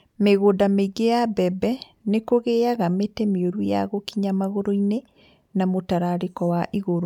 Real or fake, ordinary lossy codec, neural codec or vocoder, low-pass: real; none; none; 19.8 kHz